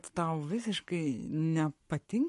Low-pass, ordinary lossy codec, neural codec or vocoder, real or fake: 14.4 kHz; MP3, 48 kbps; codec, 44.1 kHz, 7.8 kbps, Pupu-Codec; fake